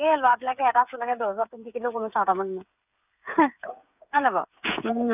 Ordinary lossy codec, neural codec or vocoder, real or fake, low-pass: none; codec, 16 kHz, 8 kbps, FreqCodec, smaller model; fake; 3.6 kHz